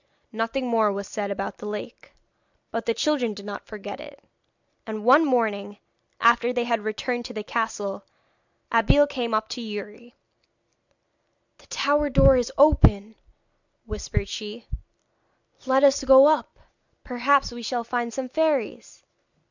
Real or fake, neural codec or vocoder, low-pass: real; none; 7.2 kHz